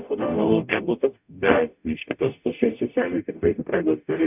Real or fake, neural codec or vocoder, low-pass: fake; codec, 44.1 kHz, 0.9 kbps, DAC; 3.6 kHz